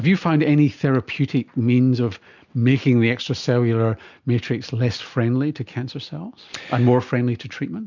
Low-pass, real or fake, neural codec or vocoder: 7.2 kHz; real; none